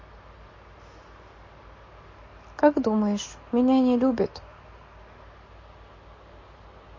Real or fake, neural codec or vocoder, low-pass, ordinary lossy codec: real; none; 7.2 kHz; MP3, 32 kbps